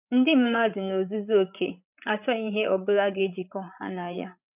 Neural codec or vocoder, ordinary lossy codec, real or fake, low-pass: codec, 16 kHz, 8 kbps, FreqCodec, larger model; none; fake; 3.6 kHz